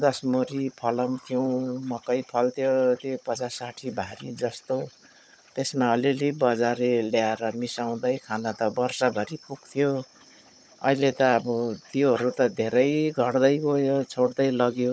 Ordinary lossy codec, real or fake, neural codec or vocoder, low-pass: none; fake; codec, 16 kHz, 16 kbps, FunCodec, trained on Chinese and English, 50 frames a second; none